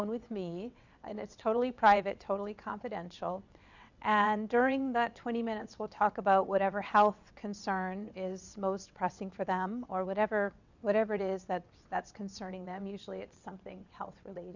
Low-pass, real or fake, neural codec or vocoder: 7.2 kHz; fake; vocoder, 22.05 kHz, 80 mel bands, WaveNeXt